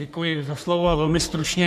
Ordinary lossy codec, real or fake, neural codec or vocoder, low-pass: MP3, 96 kbps; fake; codec, 44.1 kHz, 3.4 kbps, Pupu-Codec; 14.4 kHz